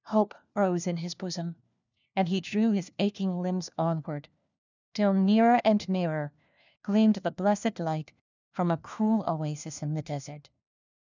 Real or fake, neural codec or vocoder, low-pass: fake; codec, 16 kHz, 1 kbps, FunCodec, trained on LibriTTS, 50 frames a second; 7.2 kHz